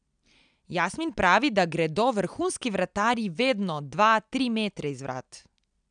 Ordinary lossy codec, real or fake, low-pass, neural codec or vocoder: none; real; 9.9 kHz; none